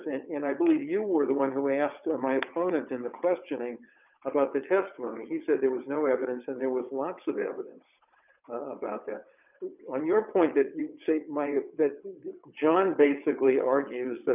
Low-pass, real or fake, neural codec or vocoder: 3.6 kHz; fake; vocoder, 22.05 kHz, 80 mel bands, WaveNeXt